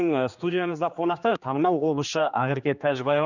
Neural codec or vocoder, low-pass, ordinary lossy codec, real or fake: codec, 16 kHz, 2 kbps, X-Codec, HuBERT features, trained on general audio; 7.2 kHz; none; fake